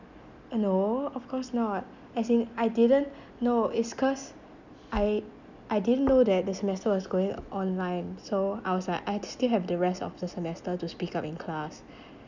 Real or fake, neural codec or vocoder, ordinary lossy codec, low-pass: real; none; none; 7.2 kHz